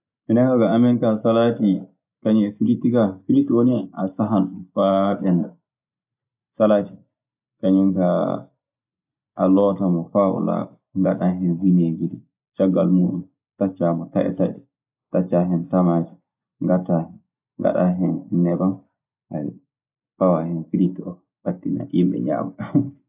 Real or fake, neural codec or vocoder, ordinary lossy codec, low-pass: real; none; AAC, 32 kbps; 3.6 kHz